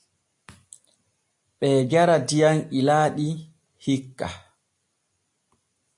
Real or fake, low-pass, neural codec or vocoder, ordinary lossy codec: real; 10.8 kHz; none; AAC, 64 kbps